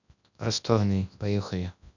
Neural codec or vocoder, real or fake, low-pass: codec, 24 kHz, 0.9 kbps, WavTokenizer, large speech release; fake; 7.2 kHz